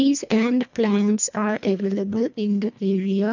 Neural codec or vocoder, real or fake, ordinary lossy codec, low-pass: codec, 24 kHz, 1.5 kbps, HILCodec; fake; none; 7.2 kHz